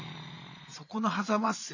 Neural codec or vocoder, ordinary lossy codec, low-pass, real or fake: vocoder, 22.05 kHz, 80 mel bands, Vocos; none; 7.2 kHz; fake